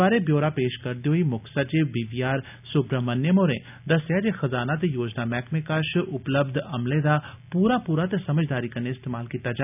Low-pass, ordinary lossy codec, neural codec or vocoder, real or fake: 3.6 kHz; none; none; real